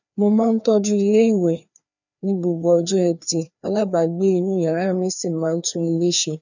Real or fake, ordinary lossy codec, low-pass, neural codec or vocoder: fake; none; 7.2 kHz; codec, 16 kHz, 2 kbps, FreqCodec, larger model